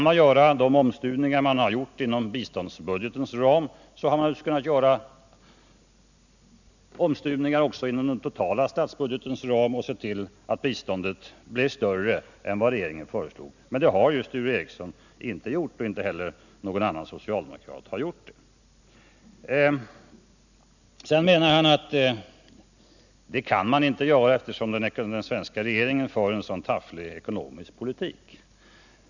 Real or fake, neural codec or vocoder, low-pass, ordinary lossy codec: real; none; 7.2 kHz; none